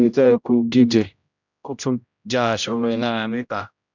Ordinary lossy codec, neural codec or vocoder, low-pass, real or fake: none; codec, 16 kHz, 0.5 kbps, X-Codec, HuBERT features, trained on general audio; 7.2 kHz; fake